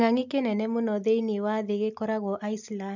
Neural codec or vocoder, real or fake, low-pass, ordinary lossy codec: none; real; 7.2 kHz; none